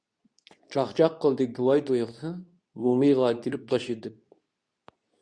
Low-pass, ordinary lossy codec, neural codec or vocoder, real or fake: 9.9 kHz; Opus, 64 kbps; codec, 24 kHz, 0.9 kbps, WavTokenizer, medium speech release version 2; fake